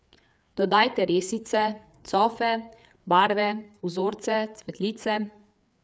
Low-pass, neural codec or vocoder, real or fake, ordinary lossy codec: none; codec, 16 kHz, 4 kbps, FreqCodec, larger model; fake; none